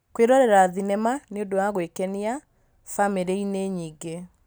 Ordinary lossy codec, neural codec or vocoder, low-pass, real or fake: none; none; none; real